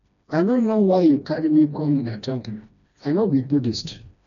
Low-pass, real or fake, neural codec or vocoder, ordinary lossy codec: 7.2 kHz; fake; codec, 16 kHz, 1 kbps, FreqCodec, smaller model; none